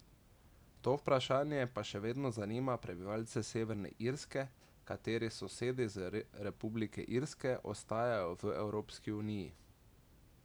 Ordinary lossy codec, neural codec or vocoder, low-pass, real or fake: none; none; none; real